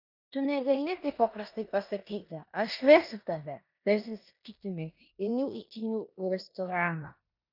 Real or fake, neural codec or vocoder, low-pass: fake; codec, 16 kHz in and 24 kHz out, 0.9 kbps, LongCat-Audio-Codec, four codebook decoder; 5.4 kHz